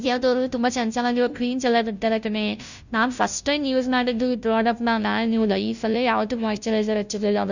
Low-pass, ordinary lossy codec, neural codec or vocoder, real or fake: 7.2 kHz; none; codec, 16 kHz, 0.5 kbps, FunCodec, trained on Chinese and English, 25 frames a second; fake